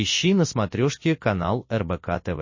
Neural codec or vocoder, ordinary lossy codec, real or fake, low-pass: none; MP3, 32 kbps; real; 7.2 kHz